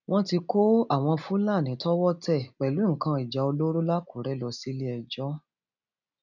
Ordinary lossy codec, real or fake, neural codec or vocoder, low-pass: none; real; none; 7.2 kHz